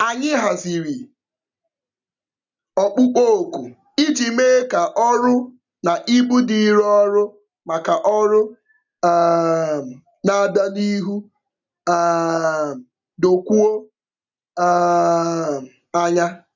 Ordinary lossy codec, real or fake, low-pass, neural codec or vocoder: none; real; 7.2 kHz; none